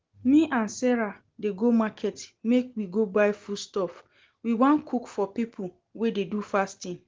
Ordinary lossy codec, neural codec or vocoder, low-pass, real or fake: Opus, 16 kbps; none; 7.2 kHz; real